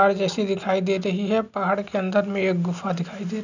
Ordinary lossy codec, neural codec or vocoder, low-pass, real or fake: none; none; 7.2 kHz; real